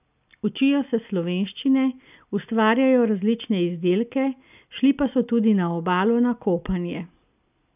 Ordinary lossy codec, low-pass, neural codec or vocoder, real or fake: none; 3.6 kHz; none; real